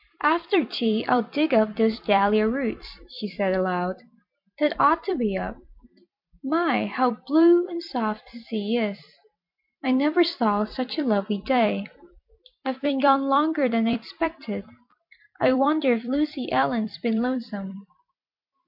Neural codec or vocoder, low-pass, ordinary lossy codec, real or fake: vocoder, 44.1 kHz, 128 mel bands every 256 samples, BigVGAN v2; 5.4 kHz; MP3, 48 kbps; fake